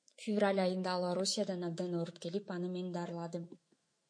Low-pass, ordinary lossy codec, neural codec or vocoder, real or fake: 9.9 kHz; MP3, 48 kbps; autoencoder, 48 kHz, 128 numbers a frame, DAC-VAE, trained on Japanese speech; fake